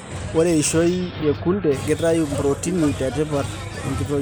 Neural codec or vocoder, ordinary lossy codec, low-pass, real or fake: none; none; none; real